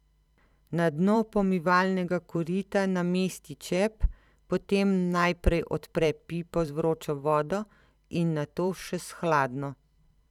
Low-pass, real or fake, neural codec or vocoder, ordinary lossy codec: 19.8 kHz; fake; vocoder, 44.1 kHz, 128 mel bands every 512 samples, BigVGAN v2; none